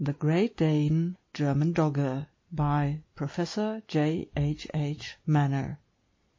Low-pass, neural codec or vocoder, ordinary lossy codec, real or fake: 7.2 kHz; none; MP3, 32 kbps; real